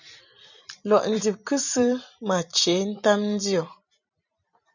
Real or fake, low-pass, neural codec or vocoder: fake; 7.2 kHz; vocoder, 44.1 kHz, 128 mel bands every 256 samples, BigVGAN v2